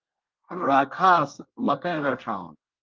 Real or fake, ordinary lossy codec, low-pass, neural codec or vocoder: fake; Opus, 32 kbps; 7.2 kHz; codec, 24 kHz, 1 kbps, SNAC